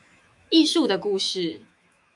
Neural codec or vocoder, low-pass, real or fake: autoencoder, 48 kHz, 128 numbers a frame, DAC-VAE, trained on Japanese speech; 10.8 kHz; fake